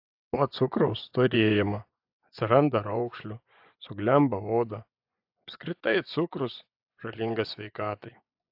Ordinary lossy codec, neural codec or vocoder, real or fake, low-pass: AAC, 48 kbps; vocoder, 24 kHz, 100 mel bands, Vocos; fake; 5.4 kHz